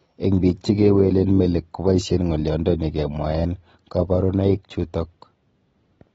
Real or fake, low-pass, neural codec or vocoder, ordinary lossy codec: real; 10.8 kHz; none; AAC, 24 kbps